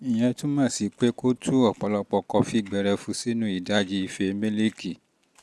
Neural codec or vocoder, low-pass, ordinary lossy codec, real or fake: none; none; none; real